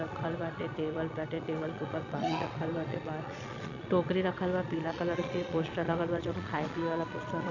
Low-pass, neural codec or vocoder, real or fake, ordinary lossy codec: 7.2 kHz; vocoder, 22.05 kHz, 80 mel bands, Vocos; fake; none